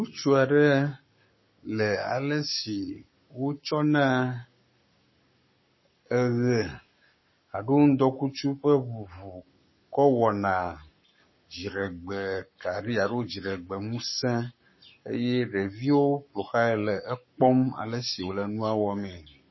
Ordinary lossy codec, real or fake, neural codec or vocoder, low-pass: MP3, 24 kbps; fake; codec, 44.1 kHz, 7.8 kbps, Pupu-Codec; 7.2 kHz